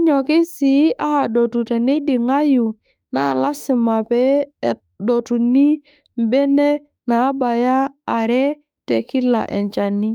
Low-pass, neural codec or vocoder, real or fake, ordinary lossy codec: 19.8 kHz; autoencoder, 48 kHz, 32 numbers a frame, DAC-VAE, trained on Japanese speech; fake; none